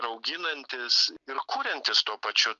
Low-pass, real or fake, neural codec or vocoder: 7.2 kHz; real; none